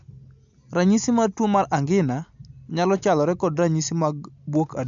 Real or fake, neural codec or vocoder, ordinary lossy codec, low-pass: real; none; MP3, 64 kbps; 7.2 kHz